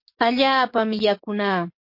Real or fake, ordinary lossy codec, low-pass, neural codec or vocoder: fake; MP3, 32 kbps; 5.4 kHz; codec, 44.1 kHz, 7.8 kbps, DAC